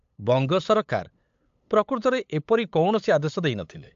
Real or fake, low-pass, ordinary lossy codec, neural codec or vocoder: fake; 7.2 kHz; AAC, 64 kbps; codec, 16 kHz, 8 kbps, FunCodec, trained on LibriTTS, 25 frames a second